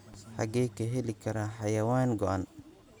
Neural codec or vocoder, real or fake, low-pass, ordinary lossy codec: none; real; none; none